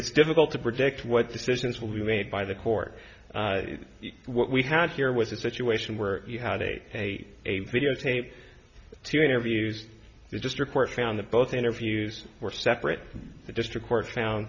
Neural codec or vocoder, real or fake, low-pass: none; real; 7.2 kHz